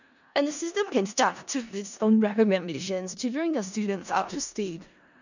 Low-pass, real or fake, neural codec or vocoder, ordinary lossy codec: 7.2 kHz; fake; codec, 16 kHz in and 24 kHz out, 0.4 kbps, LongCat-Audio-Codec, four codebook decoder; none